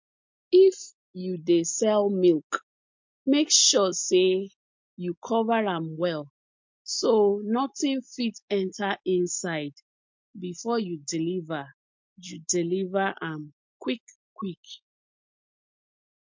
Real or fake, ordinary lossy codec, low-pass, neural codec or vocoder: real; MP3, 48 kbps; 7.2 kHz; none